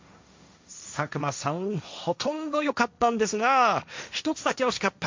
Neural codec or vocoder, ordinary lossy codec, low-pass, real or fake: codec, 16 kHz, 1.1 kbps, Voila-Tokenizer; MP3, 64 kbps; 7.2 kHz; fake